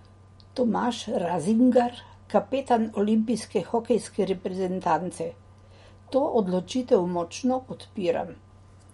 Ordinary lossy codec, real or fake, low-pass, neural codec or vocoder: MP3, 48 kbps; real; 19.8 kHz; none